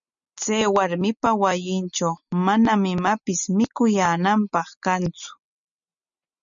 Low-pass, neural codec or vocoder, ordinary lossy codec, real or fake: 7.2 kHz; none; MP3, 96 kbps; real